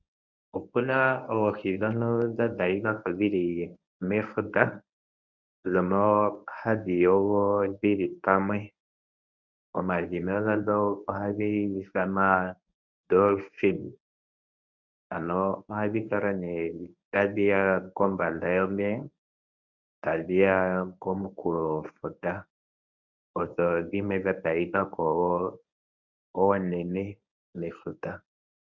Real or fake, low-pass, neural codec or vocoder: fake; 7.2 kHz; codec, 24 kHz, 0.9 kbps, WavTokenizer, medium speech release version 1